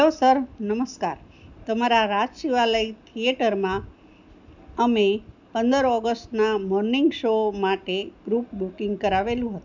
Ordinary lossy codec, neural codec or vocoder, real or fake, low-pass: none; none; real; 7.2 kHz